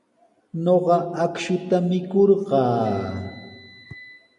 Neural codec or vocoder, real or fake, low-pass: none; real; 10.8 kHz